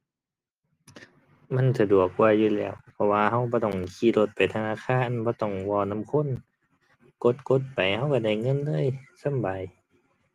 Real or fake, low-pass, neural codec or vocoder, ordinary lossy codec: real; 14.4 kHz; none; Opus, 24 kbps